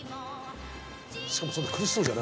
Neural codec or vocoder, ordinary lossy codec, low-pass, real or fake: none; none; none; real